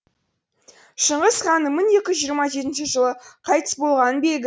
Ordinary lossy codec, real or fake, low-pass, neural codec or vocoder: none; real; none; none